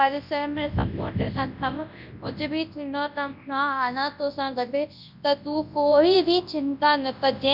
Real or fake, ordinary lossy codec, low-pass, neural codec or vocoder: fake; none; 5.4 kHz; codec, 24 kHz, 0.9 kbps, WavTokenizer, large speech release